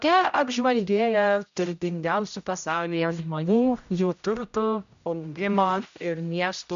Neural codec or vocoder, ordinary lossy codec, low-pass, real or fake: codec, 16 kHz, 0.5 kbps, X-Codec, HuBERT features, trained on general audio; MP3, 64 kbps; 7.2 kHz; fake